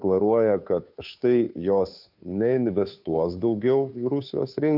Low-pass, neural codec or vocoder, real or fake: 5.4 kHz; codec, 16 kHz, 2 kbps, FunCodec, trained on Chinese and English, 25 frames a second; fake